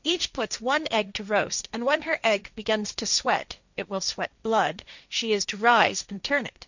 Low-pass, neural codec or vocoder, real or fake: 7.2 kHz; codec, 16 kHz, 1.1 kbps, Voila-Tokenizer; fake